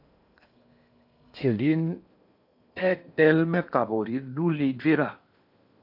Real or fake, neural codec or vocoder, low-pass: fake; codec, 16 kHz in and 24 kHz out, 0.8 kbps, FocalCodec, streaming, 65536 codes; 5.4 kHz